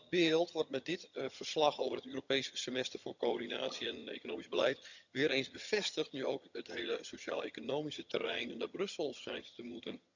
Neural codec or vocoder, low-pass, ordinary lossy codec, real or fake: vocoder, 22.05 kHz, 80 mel bands, HiFi-GAN; 7.2 kHz; none; fake